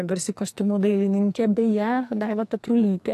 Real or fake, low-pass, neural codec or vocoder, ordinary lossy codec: fake; 14.4 kHz; codec, 44.1 kHz, 2.6 kbps, SNAC; AAC, 64 kbps